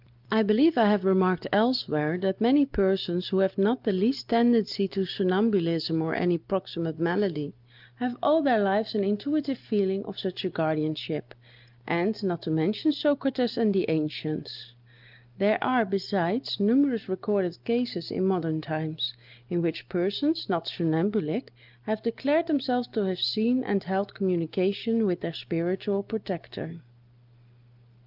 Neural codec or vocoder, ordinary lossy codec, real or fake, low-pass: none; Opus, 32 kbps; real; 5.4 kHz